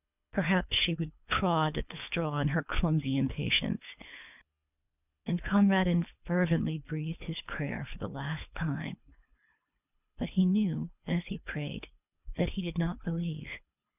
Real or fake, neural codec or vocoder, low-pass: fake; codec, 24 kHz, 3 kbps, HILCodec; 3.6 kHz